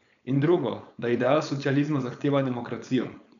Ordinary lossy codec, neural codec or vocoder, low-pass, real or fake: none; codec, 16 kHz, 4.8 kbps, FACodec; 7.2 kHz; fake